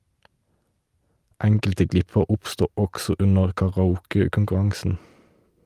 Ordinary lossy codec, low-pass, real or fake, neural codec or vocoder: Opus, 32 kbps; 14.4 kHz; real; none